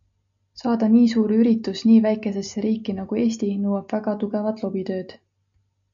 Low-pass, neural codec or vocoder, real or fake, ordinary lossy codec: 7.2 kHz; none; real; MP3, 64 kbps